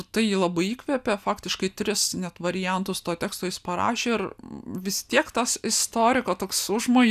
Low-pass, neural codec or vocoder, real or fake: 14.4 kHz; none; real